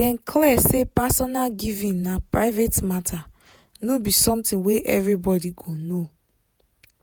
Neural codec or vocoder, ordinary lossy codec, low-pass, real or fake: vocoder, 48 kHz, 128 mel bands, Vocos; none; none; fake